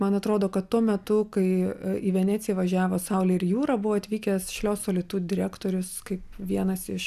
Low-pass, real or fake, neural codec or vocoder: 14.4 kHz; real; none